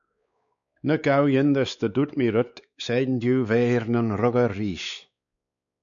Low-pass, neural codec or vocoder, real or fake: 7.2 kHz; codec, 16 kHz, 4 kbps, X-Codec, WavLM features, trained on Multilingual LibriSpeech; fake